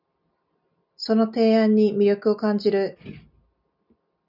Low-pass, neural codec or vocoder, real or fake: 5.4 kHz; none; real